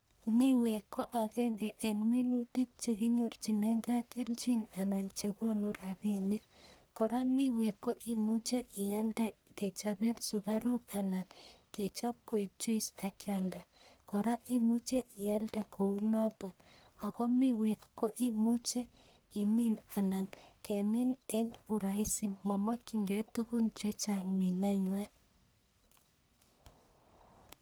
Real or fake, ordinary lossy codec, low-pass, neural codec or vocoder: fake; none; none; codec, 44.1 kHz, 1.7 kbps, Pupu-Codec